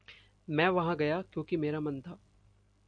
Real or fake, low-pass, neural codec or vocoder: real; 9.9 kHz; none